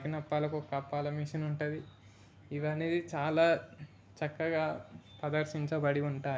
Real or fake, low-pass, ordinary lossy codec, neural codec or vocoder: real; none; none; none